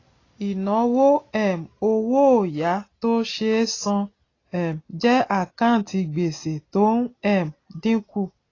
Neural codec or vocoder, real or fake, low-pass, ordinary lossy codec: none; real; 7.2 kHz; AAC, 32 kbps